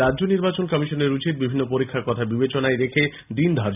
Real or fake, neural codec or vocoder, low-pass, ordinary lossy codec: real; none; 3.6 kHz; none